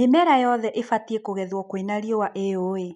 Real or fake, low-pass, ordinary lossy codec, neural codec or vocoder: real; 10.8 kHz; none; none